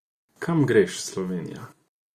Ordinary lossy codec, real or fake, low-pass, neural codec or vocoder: Opus, 64 kbps; real; 14.4 kHz; none